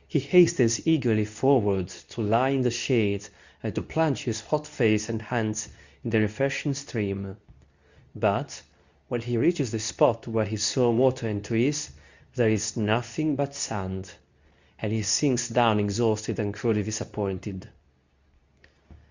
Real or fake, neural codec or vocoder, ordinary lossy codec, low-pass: fake; codec, 24 kHz, 0.9 kbps, WavTokenizer, medium speech release version 2; Opus, 64 kbps; 7.2 kHz